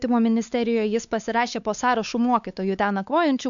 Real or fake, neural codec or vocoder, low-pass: fake; codec, 16 kHz, 4 kbps, X-Codec, WavLM features, trained on Multilingual LibriSpeech; 7.2 kHz